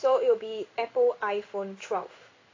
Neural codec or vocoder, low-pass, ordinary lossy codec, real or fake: codec, 16 kHz in and 24 kHz out, 1 kbps, XY-Tokenizer; 7.2 kHz; AAC, 32 kbps; fake